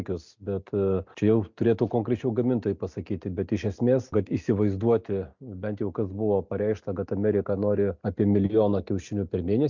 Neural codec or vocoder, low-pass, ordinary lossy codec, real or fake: none; 7.2 kHz; MP3, 64 kbps; real